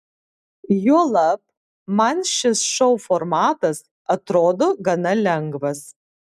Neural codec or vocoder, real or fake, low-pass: none; real; 14.4 kHz